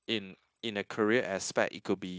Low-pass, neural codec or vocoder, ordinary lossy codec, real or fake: none; codec, 16 kHz, 0.9 kbps, LongCat-Audio-Codec; none; fake